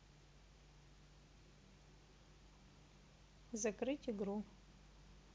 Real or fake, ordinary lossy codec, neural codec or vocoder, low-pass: real; none; none; none